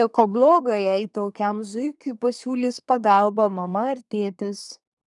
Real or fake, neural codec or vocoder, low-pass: fake; codec, 24 kHz, 1 kbps, SNAC; 10.8 kHz